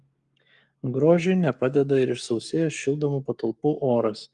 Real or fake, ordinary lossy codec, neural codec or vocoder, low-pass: fake; Opus, 32 kbps; codec, 44.1 kHz, 7.8 kbps, Pupu-Codec; 10.8 kHz